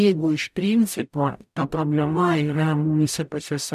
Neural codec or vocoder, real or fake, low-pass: codec, 44.1 kHz, 0.9 kbps, DAC; fake; 14.4 kHz